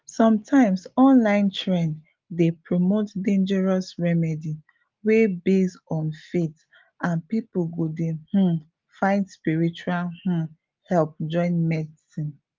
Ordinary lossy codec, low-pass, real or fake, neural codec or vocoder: Opus, 32 kbps; 7.2 kHz; real; none